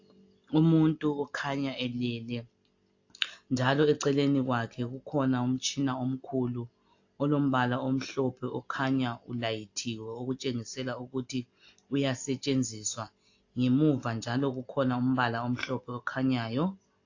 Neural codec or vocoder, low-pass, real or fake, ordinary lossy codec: none; 7.2 kHz; real; AAC, 48 kbps